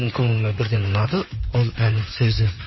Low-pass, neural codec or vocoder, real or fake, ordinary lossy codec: 7.2 kHz; codec, 16 kHz in and 24 kHz out, 2.2 kbps, FireRedTTS-2 codec; fake; MP3, 24 kbps